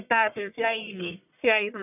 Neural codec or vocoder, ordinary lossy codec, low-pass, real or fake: codec, 44.1 kHz, 1.7 kbps, Pupu-Codec; none; 3.6 kHz; fake